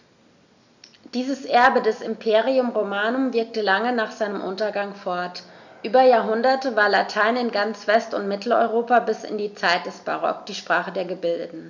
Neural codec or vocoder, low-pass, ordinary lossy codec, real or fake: none; 7.2 kHz; none; real